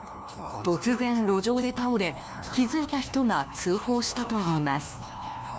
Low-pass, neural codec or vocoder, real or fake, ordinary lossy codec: none; codec, 16 kHz, 1 kbps, FunCodec, trained on LibriTTS, 50 frames a second; fake; none